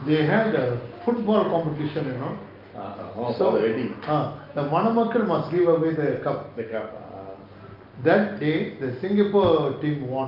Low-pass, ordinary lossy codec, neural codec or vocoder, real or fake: 5.4 kHz; Opus, 32 kbps; none; real